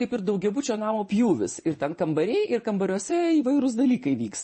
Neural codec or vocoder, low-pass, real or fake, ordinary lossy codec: vocoder, 24 kHz, 100 mel bands, Vocos; 10.8 kHz; fake; MP3, 32 kbps